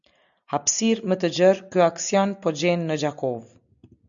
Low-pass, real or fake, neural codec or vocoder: 7.2 kHz; real; none